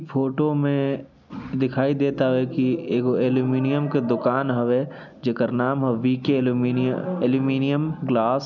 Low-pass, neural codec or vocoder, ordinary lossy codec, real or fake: 7.2 kHz; none; none; real